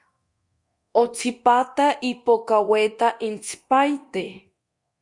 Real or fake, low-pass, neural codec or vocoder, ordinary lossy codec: fake; 10.8 kHz; codec, 24 kHz, 0.9 kbps, DualCodec; Opus, 64 kbps